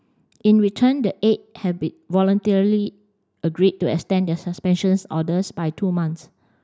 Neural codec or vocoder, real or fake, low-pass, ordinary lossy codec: none; real; none; none